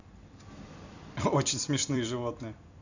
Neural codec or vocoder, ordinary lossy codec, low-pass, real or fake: vocoder, 44.1 kHz, 128 mel bands every 256 samples, BigVGAN v2; none; 7.2 kHz; fake